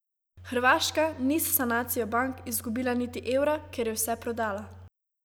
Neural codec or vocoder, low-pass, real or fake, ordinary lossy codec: none; none; real; none